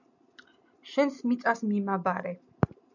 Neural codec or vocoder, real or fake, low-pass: none; real; 7.2 kHz